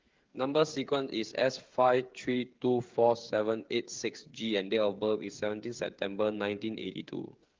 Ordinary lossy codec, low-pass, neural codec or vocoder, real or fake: Opus, 32 kbps; 7.2 kHz; codec, 16 kHz, 8 kbps, FreqCodec, smaller model; fake